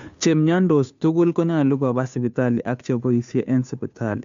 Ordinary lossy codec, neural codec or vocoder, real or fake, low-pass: MP3, 64 kbps; codec, 16 kHz, 2 kbps, FunCodec, trained on Chinese and English, 25 frames a second; fake; 7.2 kHz